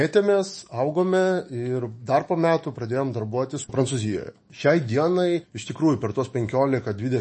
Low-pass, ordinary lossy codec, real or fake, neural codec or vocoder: 10.8 kHz; MP3, 32 kbps; fake; autoencoder, 48 kHz, 128 numbers a frame, DAC-VAE, trained on Japanese speech